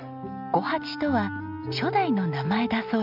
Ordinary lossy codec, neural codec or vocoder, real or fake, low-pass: none; none; real; 5.4 kHz